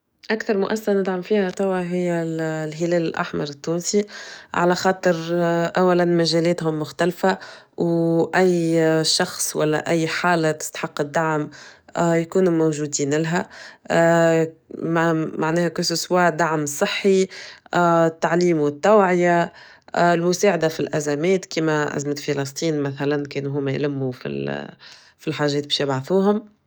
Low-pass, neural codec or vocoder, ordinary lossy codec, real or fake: none; codec, 44.1 kHz, 7.8 kbps, DAC; none; fake